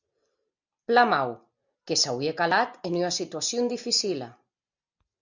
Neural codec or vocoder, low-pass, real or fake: none; 7.2 kHz; real